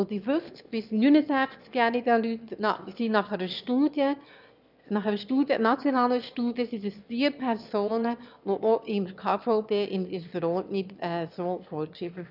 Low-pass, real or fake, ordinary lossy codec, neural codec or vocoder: 5.4 kHz; fake; none; autoencoder, 22.05 kHz, a latent of 192 numbers a frame, VITS, trained on one speaker